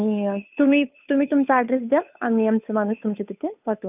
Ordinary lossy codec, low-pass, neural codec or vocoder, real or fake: none; 3.6 kHz; codec, 16 kHz, 2 kbps, FunCodec, trained on Chinese and English, 25 frames a second; fake